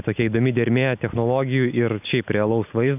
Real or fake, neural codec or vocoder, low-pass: real; none; 3.6 kHz